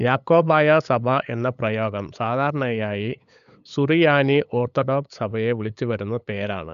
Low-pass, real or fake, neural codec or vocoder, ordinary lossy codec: 7.2 kHz; fake; codec, 16 kHz, 8 kbps, FunCodec, trained on LibriTTS, 25 frames a second; none